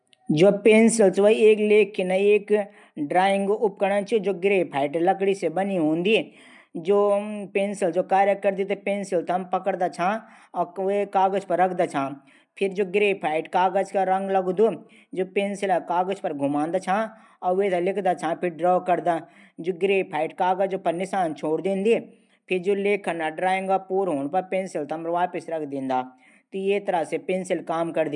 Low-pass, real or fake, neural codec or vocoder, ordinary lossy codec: 10.8 kHz; real; none; MP3, 96 kbps